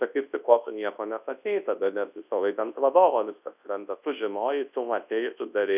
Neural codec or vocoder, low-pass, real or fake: codec, 24 kHz, 0.9 kbps, WavTokenizer, large speech release; 3.6 kHz; fake